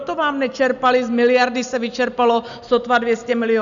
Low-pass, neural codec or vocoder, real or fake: 7.2 kHz; none; real